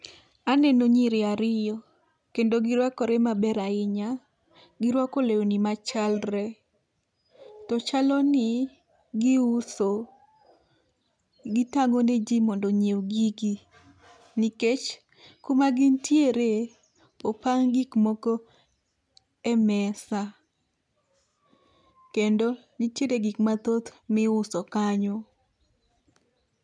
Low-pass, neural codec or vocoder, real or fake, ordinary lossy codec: 9.9 kHz; none; real; none